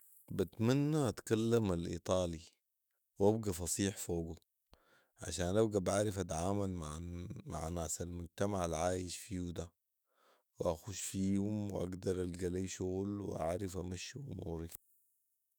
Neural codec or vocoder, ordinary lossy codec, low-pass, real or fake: autoencoder, 48 kHz, 128 numbers a frame, DAC-VAE, trained on Japanese speech; none; none; fake